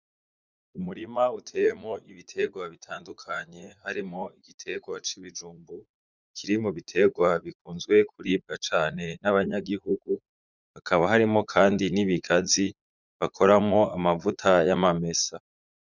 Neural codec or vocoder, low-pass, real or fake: vocoder, 44.1 kHz, 80 mel bands, Vocos; 7.2 kHz; fake